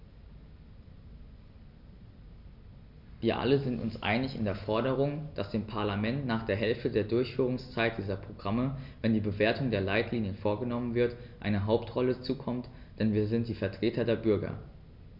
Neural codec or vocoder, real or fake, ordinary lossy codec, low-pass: none; real; none; 5.4 kHz